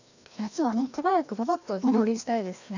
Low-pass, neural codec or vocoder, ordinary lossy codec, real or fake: 7.2 kHz; codec, 16 kHz, 1 kbps, FreqCodec, larger model; none; fake